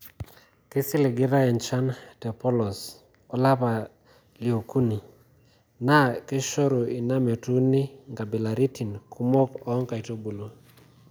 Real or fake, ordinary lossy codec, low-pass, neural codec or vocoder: real; none; none; none